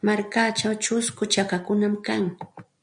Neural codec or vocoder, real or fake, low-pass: none; real; 9.9 kHz